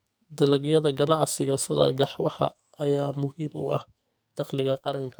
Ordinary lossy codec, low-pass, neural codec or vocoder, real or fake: none; none; codec, 44.1 kHz, 2.6 kbps, SNAC; fake